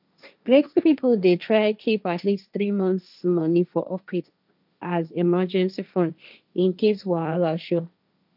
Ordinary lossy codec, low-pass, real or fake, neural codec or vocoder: none; 5.4 kHz; fake; codec, 16 kHz, 1.1 kbps, Voila-Tokenizer